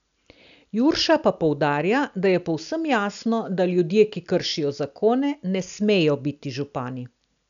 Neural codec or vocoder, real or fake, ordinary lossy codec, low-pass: none; real; none; 7.2 kHz